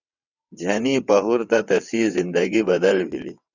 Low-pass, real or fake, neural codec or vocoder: 7.2 kHz; fake; vocoder, 22.05 kHz, 80 mel bands, WaveNeXt